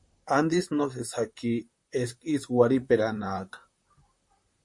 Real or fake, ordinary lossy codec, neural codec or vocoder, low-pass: fake; MP3, 48 kbps; vocoder, 44.1 kHz, 128 mel bands, Pupu-Vocoder; 10.8 kHz